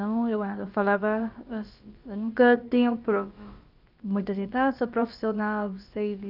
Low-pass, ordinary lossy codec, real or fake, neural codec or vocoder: 5.4 kHz; Opus, 24 kbps; fake; codec, 16 kHz, about 1 kbps, DyCAST, with the encoder's durations